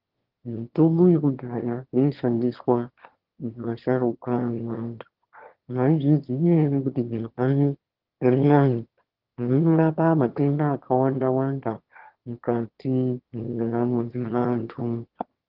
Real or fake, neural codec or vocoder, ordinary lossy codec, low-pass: fake; autoencoder, 22.05 kHz, a latent of 192 numbers a frame, VITS, trained on one speaker; Opus, 16 kbps; 5.4 kHz